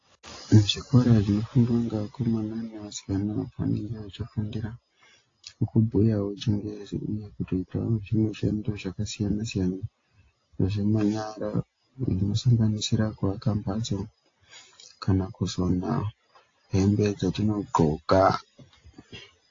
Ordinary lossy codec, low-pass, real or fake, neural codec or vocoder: AAC, 32 kbps; 7.2 kHz; real; none